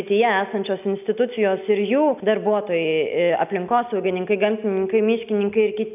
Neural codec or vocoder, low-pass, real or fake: none; 3.6 kHz; real